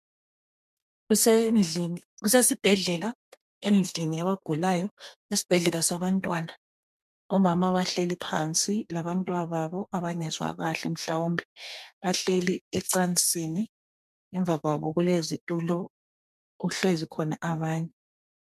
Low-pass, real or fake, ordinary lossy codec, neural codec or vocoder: 14.4 kHz; fake; MP3, 96 kbps; codec, 32 kHz, 1.9 kbps, SNAC